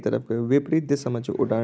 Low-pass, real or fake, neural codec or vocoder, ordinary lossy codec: none; real; none; none